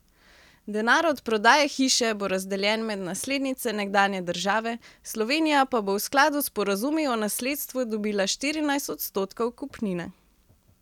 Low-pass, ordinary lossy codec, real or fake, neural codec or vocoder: 19.8 kHz; none; real; none